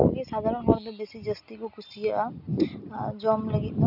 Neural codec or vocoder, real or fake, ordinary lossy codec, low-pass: none; real; none; 5.4 kHz